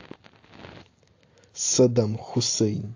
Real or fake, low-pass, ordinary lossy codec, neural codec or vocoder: real; 7.2 kHz; AAC, 48 kbps; none